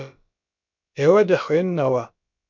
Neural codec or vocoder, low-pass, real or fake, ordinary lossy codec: codec, 16 kHz, about 1 kbps, DyCAST, with the encoder's durations; 7.2 kHz; fake; MP3, 64 kbps